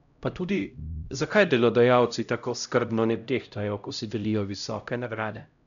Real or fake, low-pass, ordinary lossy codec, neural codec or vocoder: fake; 7.2 kHz; none; codec, 16 kHz, 0.5 kbps, X-Codec, HuBERT features, trained on LibriSpeech